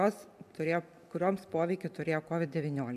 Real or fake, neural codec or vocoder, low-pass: real; none; 14.4 kHz